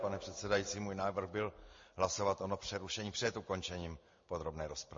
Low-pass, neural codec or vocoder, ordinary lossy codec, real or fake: 7.2 kHz; none; MP3, 32 kbps; real